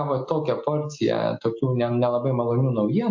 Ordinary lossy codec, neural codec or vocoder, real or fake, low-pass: MP3, 48 kbps; none; real; 7.2 kHz